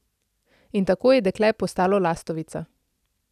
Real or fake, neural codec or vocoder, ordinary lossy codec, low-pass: real; none; none; 14.4 kHz